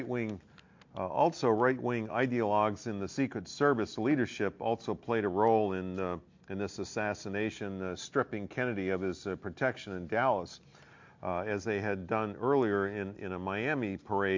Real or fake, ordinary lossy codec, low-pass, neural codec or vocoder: real; MP3, 48 kbps; 7.2 kHz; none